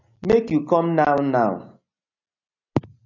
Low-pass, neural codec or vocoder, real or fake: 7.2 kHz; none; real